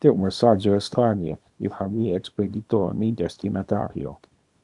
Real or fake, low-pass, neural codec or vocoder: fake; 10.8 kHz; codec, 24 kHz, 0.9 kbps, WavTokenizer, small release